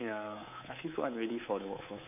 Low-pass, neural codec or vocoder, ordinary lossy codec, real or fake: 3.6 kHz; codec, 24 kHz, 3.1 kbps, DualCodec; none; fake